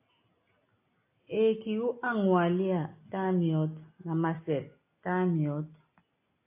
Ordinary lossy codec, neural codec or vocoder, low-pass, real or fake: AAC, 24 kbps; none; 3.6 kHz; real